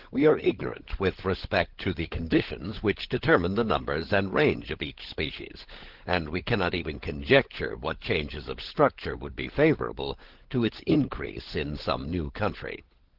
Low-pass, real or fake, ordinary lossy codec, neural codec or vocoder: 5.4 kHz; fake; Opus, 16 kbps; codec, 16 kHz, 16 kbps, FunCodec, trained on LibriTTS, 50 frames a second